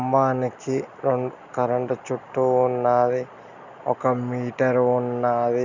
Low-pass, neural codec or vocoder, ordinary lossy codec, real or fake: 7.2 kHz; none; none; real